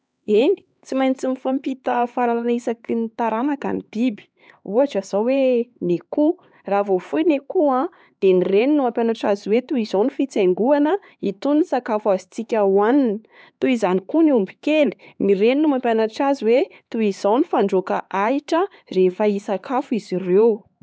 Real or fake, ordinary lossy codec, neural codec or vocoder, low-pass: fake; none; codec, 16 kHz, 4 kbps, X-Codec, HuBERT features, trained on LibriSpeech; none